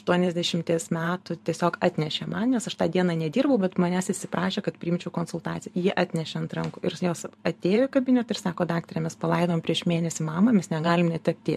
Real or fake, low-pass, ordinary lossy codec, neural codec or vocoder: fake; 14.4 kHz; MP3, 64 kbps; vocoder, 44.1 kHz, 128 mel bands every 512 samples, BigVGAN v2